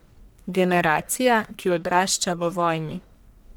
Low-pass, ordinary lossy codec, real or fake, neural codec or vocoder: none; none; fake; codec, 44.1 kHz, 1.7 kbps, Pupu-Codec